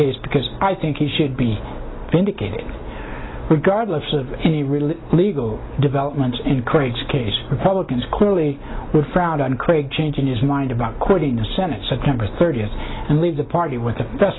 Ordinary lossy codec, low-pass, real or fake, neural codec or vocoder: AAC, 16 kbps; 7.2 kHz; real; none